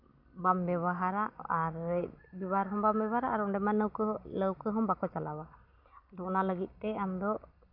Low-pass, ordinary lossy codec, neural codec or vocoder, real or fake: 5.4 kHz; MP3, 48 kbps; none; real